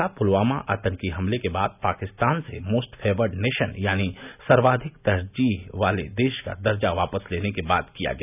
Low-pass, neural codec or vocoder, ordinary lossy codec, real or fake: 3.6 kHz; none; none; real